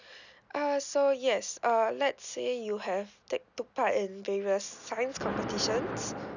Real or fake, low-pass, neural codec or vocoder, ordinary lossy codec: real; 7.2 kHz; none; none